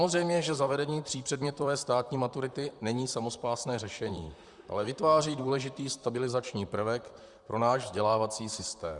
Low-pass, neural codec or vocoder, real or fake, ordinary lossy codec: 10.8 kHz; vocoder, 44.1 kHz, 128 mel bands, Pupu-Vocoder; fake; Opus, 64 kbps